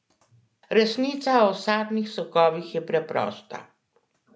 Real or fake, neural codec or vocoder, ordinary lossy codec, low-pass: real; none; none; none